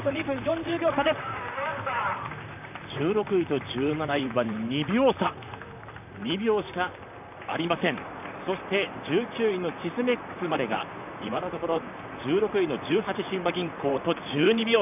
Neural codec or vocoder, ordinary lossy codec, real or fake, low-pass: vocoder, 44.1 kHz, 128 mel bands, Pupu-Vocoder; none; fake; 3.6 kHz